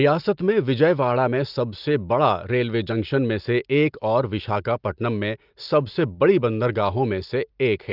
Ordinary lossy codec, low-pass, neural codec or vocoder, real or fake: Opus, 32 kbps; 5.4 kHz; none; real